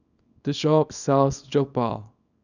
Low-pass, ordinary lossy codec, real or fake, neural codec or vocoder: 7.2 kHz; none; fake; codec, 24 kHz, 0.9 kbps, WavTokenizer, small release